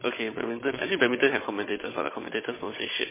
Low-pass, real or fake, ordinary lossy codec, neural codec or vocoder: 3.6 kHz; fake; MP3, 16 kbps; codec, 16 kHz, 16 kbps, FunCodec, trained on Chinese and English, 50 frames a second